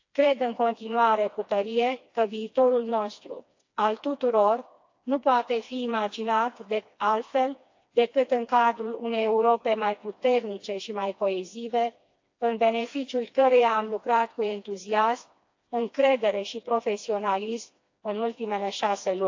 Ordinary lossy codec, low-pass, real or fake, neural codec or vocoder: AAC, 48 kbps; 7.2 kHz; fake; codec, 16 kHz, 2 kbps, FreqCodec, smaller model